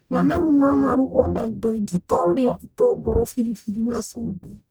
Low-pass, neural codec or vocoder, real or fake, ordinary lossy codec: none; codec, 44.1 kHz, 0.9 kbps, DAC; fake; none